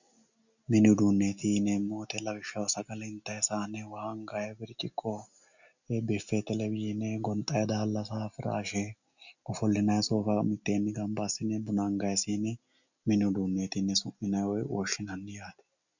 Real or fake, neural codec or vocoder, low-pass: real; none; 7.2 kHz